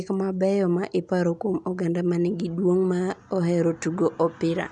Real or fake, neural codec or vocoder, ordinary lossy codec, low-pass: real; none; none; 10.8 kHz